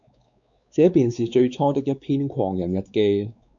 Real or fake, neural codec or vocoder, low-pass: fake; codec, 16 kHz, 4 kbps, X-Codec, WavLM features, trained on Multilingual LibriSpeech; 7.2 kHz